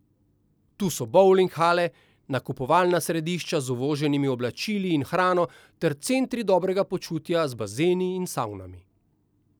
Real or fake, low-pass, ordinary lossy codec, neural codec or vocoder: real; none; none; none